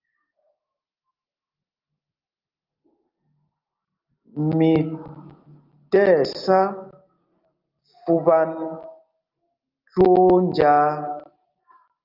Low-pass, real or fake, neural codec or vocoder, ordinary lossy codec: 5.4 kHz; real; none; Opus, 32 kbps